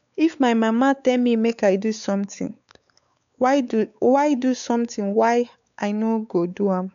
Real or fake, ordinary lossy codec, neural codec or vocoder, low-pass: fake; none; codec, 16 kHz, 4 kbps, X-Codec, WavLM features, trained on Multilingual LibriSpeech; 7.2 kHz